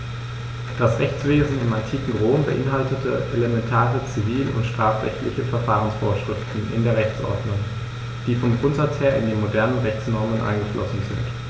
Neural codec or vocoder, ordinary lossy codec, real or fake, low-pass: none; none; real; none